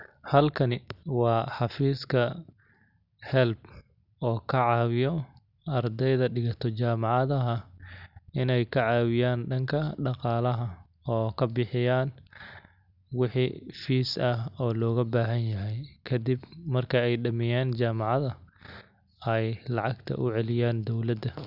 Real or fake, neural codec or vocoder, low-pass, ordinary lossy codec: real; none; 5.4 kHz; none